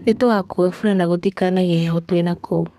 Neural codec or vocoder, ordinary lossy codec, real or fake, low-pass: codec, 32 kHz, 1.9 kbps, SNAC; none; fake; 14.4 kHz